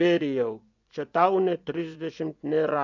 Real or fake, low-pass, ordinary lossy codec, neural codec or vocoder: fake; 7.2 kHz; MP3, 64 kbps; vocoder, 24 kHz, 100 mel bands, Vocos